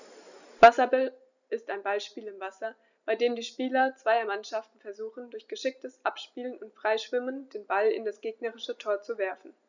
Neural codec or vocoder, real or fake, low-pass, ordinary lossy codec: none; real; 7.2 kHz; none